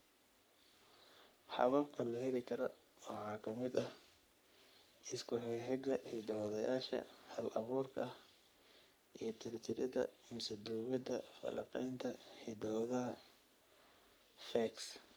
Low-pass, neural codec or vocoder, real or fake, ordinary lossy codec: none; codec, 44.1 kHz, 3.4 kbps, Pupu-Codec; fake; none